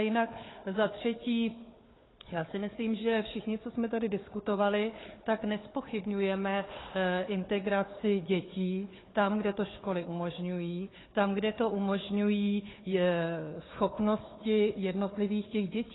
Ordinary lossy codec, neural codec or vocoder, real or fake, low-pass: AAC, 16 kbps; codec, 16 kHz, 4 kbps, FunCodec, trained on Chinese and English, 50 frames a second; fake; 7.2 kHz